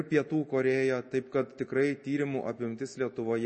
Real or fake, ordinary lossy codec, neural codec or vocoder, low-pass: real; MP3, 32 kbps; none; 10.8 kHz